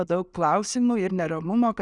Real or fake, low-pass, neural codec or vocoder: real; 10.8 kHz; none